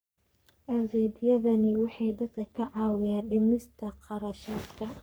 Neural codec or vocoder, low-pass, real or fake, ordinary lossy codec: codec, 44.1 kHz, 3.4 kbps, Pupu-Codec; none; fake; none